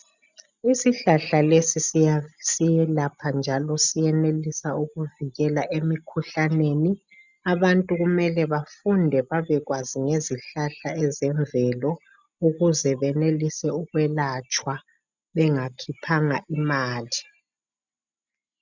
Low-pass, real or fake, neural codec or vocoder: 7.2 kHz; real; none